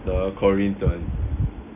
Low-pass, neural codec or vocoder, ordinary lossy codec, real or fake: 3.6 kHz; none; none; real